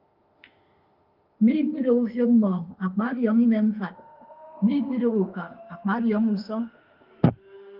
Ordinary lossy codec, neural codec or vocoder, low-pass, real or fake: Opus, 32 kbps; autoencoder, 48 kHz, 32 numbers a frame, DAC-VAE, trained on Japanese speech; 5.4 kHz; fake